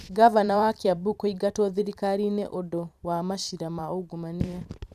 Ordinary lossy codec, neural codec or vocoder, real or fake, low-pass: none; vocoder, 44.1 kHz, 128 mel bands every 512 samples, BigVGAN v2; fake; 14.4 kHz